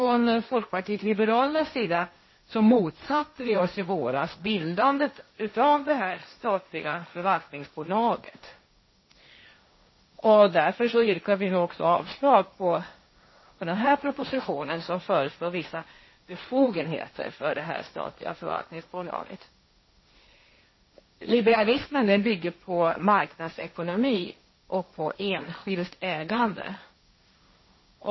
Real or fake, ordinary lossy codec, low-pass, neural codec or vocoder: fake; MP3, 24 kbps; 7.2 kHz; codec, 16 kHz, 1.1 kbps, Voila-Tokenizer